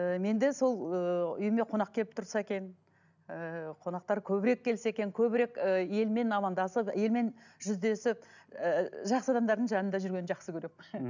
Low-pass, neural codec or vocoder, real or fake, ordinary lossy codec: 7.2 kHz; none; real; none